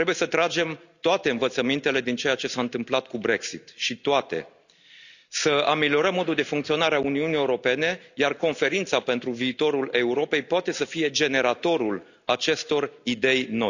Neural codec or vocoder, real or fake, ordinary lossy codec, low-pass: none; real; none; 7.2 kHz